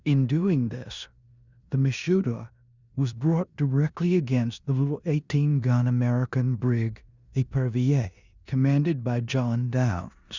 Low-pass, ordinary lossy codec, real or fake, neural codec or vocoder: 7.2 kHz; Opus, 64 kbps; fake; codec, 16 kHz in and 24 kHz out, 0.9 kbps, LongCat-Audio-Codec, four codebook decoder